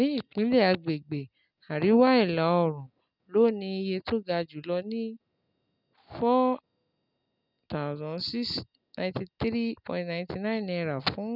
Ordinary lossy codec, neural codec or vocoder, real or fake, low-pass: none; none; real; 5.4 kHz